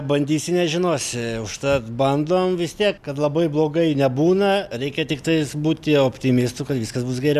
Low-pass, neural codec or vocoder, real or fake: 14.4 kHz; none; real